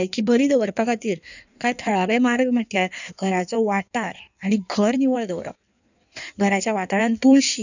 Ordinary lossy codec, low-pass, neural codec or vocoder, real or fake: none; 7.2 kHz; codec, 16 kHz in and 24 kHz out, 1.1 kbps, FireRedTTS-2 codec; fake